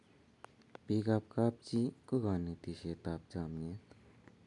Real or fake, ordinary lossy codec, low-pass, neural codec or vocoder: real; none; 10.8 kHz; none